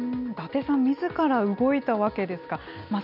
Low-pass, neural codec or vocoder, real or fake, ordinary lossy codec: 5.4 kHz; none; real; none